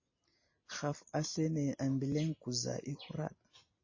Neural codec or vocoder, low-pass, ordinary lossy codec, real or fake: none; 7.2 kHz; MP3, 32 kbps; real